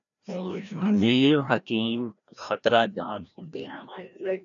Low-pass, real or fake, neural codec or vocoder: 7.2 kHz; fake; codec, 16 kHz, 1 kbps, FreqCodec, larger model